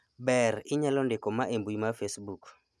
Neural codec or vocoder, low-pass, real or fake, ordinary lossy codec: none; none; real; none